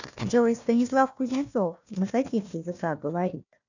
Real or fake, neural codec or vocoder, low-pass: fake; codec, 16 kHz, 1 kbps, FunCodec, trained on Chinese and English, 50 frames a second; 7.2 kHz